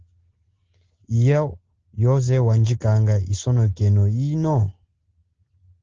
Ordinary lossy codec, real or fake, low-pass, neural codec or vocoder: Opus, 16 kbps; real; 7.2 kHz; none